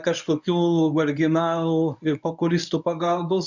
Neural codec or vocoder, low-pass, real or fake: codec, 24 kHz, 0.9 kbps, WavTokenizer, medium speech release version 1; 7.2 kHz; fake